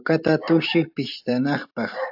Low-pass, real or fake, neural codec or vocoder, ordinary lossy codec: 5.4 kHz; fake; vocoder, 44.1 kHz, 128 mel bands every 512 samples, BigVGAN v2; AAC, 48 kbps